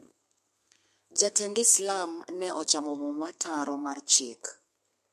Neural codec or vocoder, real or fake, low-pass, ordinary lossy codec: codec, 32 kHz, 1.9 kbps, SNAC; fake; 14.4 kHz; MP3, 64 kbps